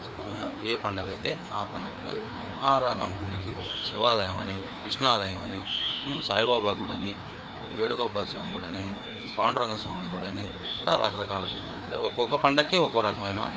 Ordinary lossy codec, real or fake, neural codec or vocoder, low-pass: none; fake; codec, 16 kHz, 2 kbps, FreqCodec, larger model; none